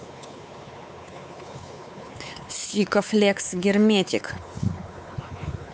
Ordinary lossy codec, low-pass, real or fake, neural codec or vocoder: none; none; fake; codec, 16 kHz, 4 kbps, X-Codec, WavLM features, trained on Multilingual LibriSpeech